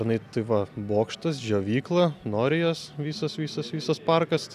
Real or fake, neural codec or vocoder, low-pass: real; none; 14.4 kHz